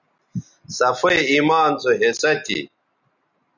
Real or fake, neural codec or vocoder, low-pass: real; none; 7.2 kHz